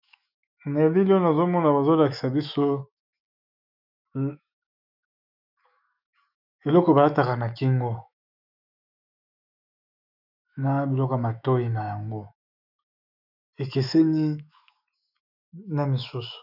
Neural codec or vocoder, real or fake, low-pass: autoencoder, 48 kHz, 128 numbers a frame, DAC-VAE, trained on Japanese speech; fake; 5.4 kHz